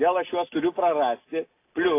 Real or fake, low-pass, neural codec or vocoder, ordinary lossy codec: real; 3.6 kHz; none; AAC, 24 kbps